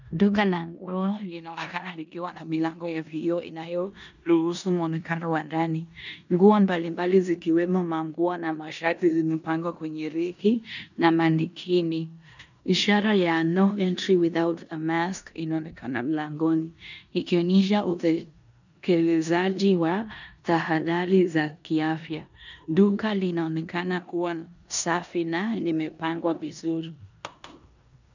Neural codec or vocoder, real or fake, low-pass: codec, 16 kHz in and 24 kHz out, 0.9 kbps, LongCat-Audio-Codec, four codebook decoder; fake; 7.2 kHz